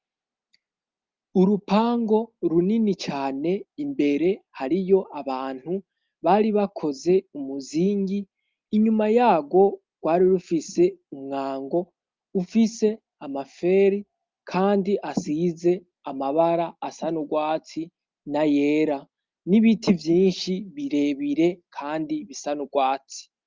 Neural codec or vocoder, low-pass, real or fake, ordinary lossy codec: none; 7.2 kHz; real; Opus, 24 kbps